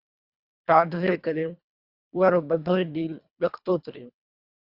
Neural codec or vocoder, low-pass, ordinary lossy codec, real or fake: codec, 24 kHz, 1.5 kbps, HILCodec; 5.4 kHz; Opus, 64 kbps; fake